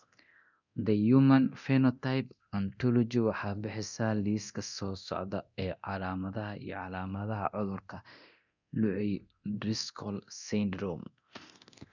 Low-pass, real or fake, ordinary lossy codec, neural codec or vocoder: 7.2 kHz; fake; none; codec, 24 kHz, 0.9 kbps, DualCodec